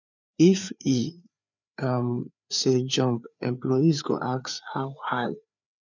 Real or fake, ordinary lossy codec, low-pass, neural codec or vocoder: fake; none; 7.2 kHz; codec, 16 kHz, 4 kbps, FreqCodec, larger model